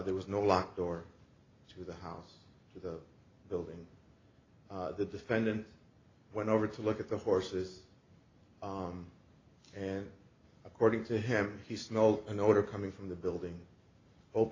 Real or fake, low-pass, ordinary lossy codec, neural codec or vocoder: real; 7.2 kHz; AAC, 32 kbps; none